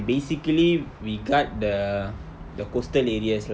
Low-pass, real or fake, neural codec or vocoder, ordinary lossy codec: none; real; none; none